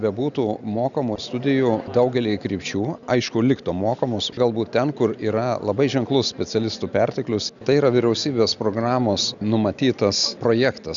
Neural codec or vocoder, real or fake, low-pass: none; real; 7.2 kHz